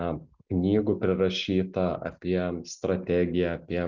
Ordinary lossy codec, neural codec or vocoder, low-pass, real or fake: Opus, 64 kbps; none; 7.2 kHz; real